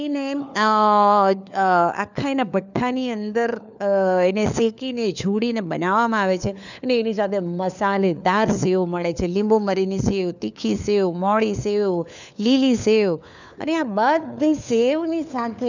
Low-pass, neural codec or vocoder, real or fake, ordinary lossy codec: 7.2 kHz; codec, 16 kHz, 4 kbps, FunCodec, trained on LibriTTS, 50 frames a second; fake; none